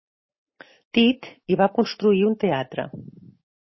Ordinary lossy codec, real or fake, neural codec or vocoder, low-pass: MP3, 24 kbps; real; none; 7.2 kHz